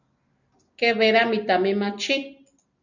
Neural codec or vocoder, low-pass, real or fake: none; 7.2 kHz; real